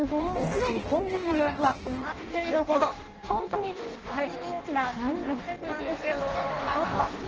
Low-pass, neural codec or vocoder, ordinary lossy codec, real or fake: 7.2 kHz; codec, 16 kHz in and 24 kHz out, 0.6 kbps, FireRedTTS-2 codec; Opus, 16 kbps; fake